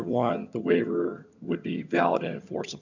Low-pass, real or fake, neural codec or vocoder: 7.2 kHz; fake; vocoder, 22.05 kHz, 80 mel bands, HiFi-GAN